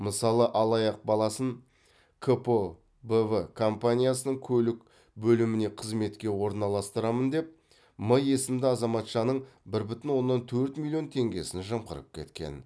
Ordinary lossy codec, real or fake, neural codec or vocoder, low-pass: none; real; none; none